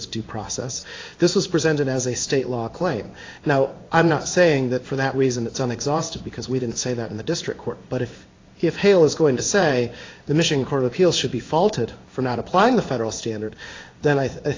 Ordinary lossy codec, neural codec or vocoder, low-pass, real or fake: AAC, 32 kbps; codec, 16 kHz in and 24 kHz out, 1 kbps, XY-Tokenizer; 7.2 kHz; fake